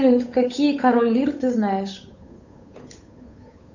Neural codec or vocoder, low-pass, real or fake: codec, 16 kHz, 8 kbps, FunCodec, trained on Chinese and English, 25 frames a second; 7.2 kHz; fake